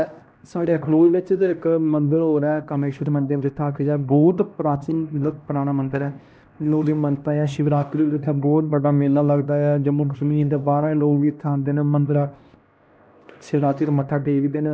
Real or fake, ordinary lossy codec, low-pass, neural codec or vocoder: fake; none; none; codec, 16 kHz, 1 kbps, X-Codec, HuBERT features, trained on LibriSpeech